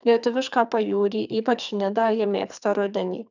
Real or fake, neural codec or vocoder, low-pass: fake; codec, 44.1 kHz, 2.6 kbps, SNAC; 7.2 kHz